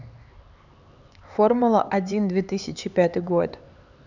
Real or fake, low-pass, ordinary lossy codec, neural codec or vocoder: fake; 7.2 kHz; none; codec, 16 kHz, 4 kbps, X-Codec, HuBERT features, trained on LibriSpeech